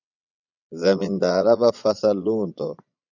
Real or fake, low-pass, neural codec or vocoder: fake; 7.2 kHz; vocoder, 22.05 kHz, 80 mel bands, Vocos